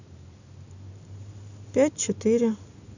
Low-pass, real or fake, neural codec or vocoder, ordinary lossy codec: 7.2 kHz; real; none; none